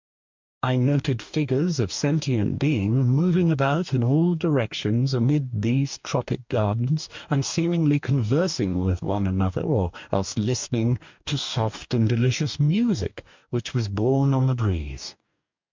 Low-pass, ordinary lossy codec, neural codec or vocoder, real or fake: 7.2 kHz; MP3, 64 kbps; codec, 44.1 kHz, 2.6 kbps, DAC; fake